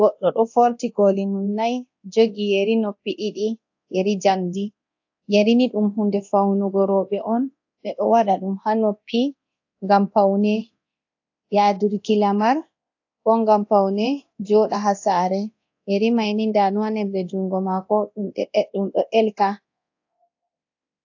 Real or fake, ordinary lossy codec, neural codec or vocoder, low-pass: fake; AAC, 48 kbps; codec, 24 kHz, 0.9 kbps, DualCodec; 7.2 kHz